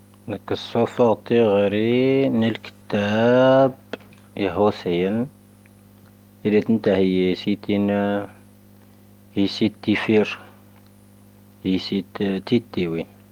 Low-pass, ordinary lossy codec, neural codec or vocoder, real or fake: 19.8 kHz; Opus, 32 kbps; none; real